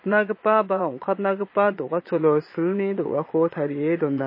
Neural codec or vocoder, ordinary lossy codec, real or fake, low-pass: vocoder, 22.05 kHz, 80 mel bands, Vocos; MP3, 24 kbps; fake; 5.4 kHz